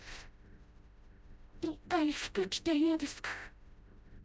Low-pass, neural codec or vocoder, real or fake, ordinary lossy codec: none; codec, 16 kHz, 0.5 kbps, FreqCodec, smaller model; fake; none